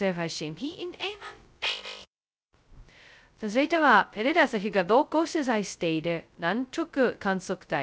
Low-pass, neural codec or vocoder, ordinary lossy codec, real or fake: none; codec, 16 kHz, 0.2 kbps, FocalCodec; none; fake